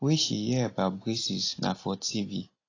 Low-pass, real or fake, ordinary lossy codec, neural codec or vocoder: 7.2 kHz; real; AAC, 32 kbps; none